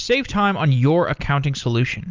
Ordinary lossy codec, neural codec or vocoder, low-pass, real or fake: Opus, 24 kbps; none; 7.2 kHz; real